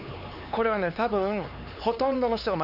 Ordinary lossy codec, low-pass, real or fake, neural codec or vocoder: none; 5.4 kHz; fake; codec, 16 kHz, 4 kbps, X-Codec, HuBERT features, trained on LibriSpeech